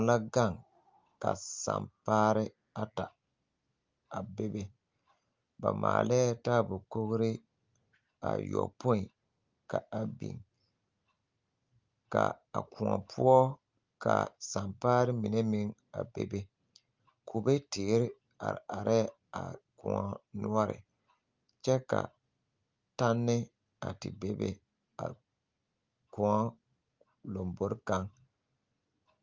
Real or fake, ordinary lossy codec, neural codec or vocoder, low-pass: real; Opus, 32 kbps; none; 7.2 kHz